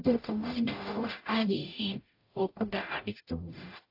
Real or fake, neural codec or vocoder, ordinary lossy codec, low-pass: fake; codec, 44.1 kHz, 0.9 kbps, DAC; AAC, 32 kbps; 5.4 kHz